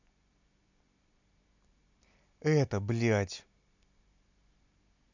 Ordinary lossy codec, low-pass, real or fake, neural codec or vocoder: none; 7.2 kHz; real; none